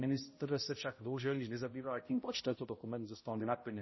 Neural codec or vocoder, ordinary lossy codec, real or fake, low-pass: codec, 16 kHz, 0.5 kbps, X-Codec, HuBERT features, trained on balanced general audio; MP3, 24 kbps; fake; 7.2 kHz